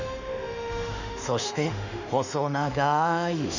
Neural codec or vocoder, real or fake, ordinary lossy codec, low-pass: autoencoder, 48 kHz, 32 numbers a frame, DAC-VAE, trained on Japanese speech; fake; none; 7.2 kHz